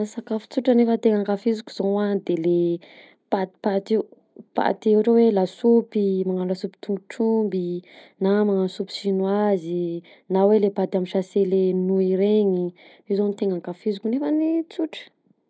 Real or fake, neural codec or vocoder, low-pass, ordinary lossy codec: real; none; none; none